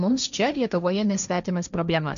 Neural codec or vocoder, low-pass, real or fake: codec, 16 kHz, 1.1 kbps, Voila-Tokenizer; 7.2 kHz; fake